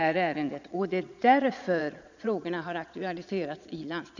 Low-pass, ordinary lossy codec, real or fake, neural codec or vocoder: 7.2 kHz; none; fake; vocoder, 22.05 kHz, 80 mel bands, Vocos